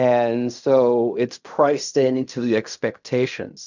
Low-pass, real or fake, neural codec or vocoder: 7.2 kHz; fake; codec, 16 kHz in and 24 kHz out, 0.4 kbps, LongCat-Audio-Codec, fine tuned four codebook decoder